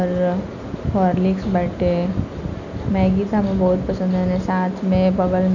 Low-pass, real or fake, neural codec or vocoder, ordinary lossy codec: 7.2 kHz; real; none; none